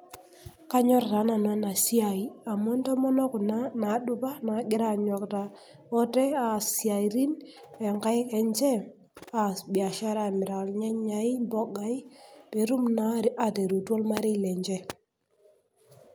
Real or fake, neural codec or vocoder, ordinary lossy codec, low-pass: real; none; none; none